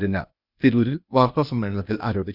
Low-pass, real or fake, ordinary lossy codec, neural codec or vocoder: 5.4 kHz; fake; none; codec, 16 kHz, 0.8 kbps, ZipCodec